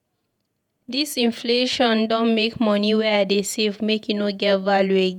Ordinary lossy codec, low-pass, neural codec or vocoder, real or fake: none; 19.8 kHz; vocoder, 48 kHz, 128 mel bands, Vocos; fake